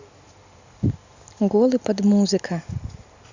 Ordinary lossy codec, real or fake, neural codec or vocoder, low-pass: Opus, 64 kbps; real; none; 7.2 kHz